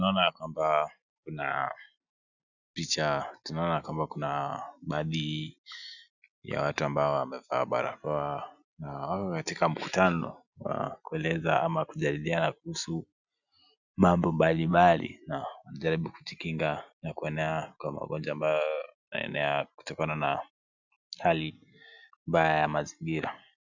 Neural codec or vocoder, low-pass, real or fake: none; 7.2 kHz; real